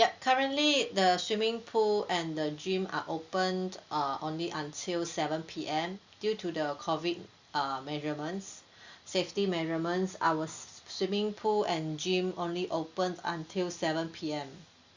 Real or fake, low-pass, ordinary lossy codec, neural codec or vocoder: real; 7.2 kHz; Opus, 64 kbps; none